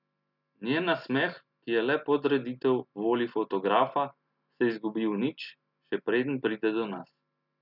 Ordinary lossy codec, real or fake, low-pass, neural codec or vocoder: none; real; 5.4 kHz; none